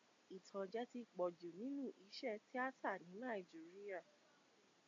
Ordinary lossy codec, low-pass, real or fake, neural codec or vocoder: AAC, 48 kbps; 7.2 kHz; real; none